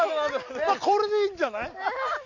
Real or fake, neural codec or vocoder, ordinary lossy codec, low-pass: real; none; Opus, 64 kbps; 7.2 kHz